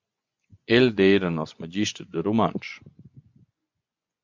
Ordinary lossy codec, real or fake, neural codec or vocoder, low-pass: MP3, 64 kbps; fake; vocoder, 24 kHz, 100 mel bands, Vocos; 7.2 kHz